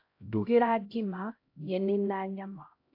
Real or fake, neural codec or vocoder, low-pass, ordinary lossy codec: fake; codec, 16 kHz, 0.5 kbps, X-Codec, HuBERT features, trained on LibriSpeech; 5.4 kHz; AAC, 32 kbps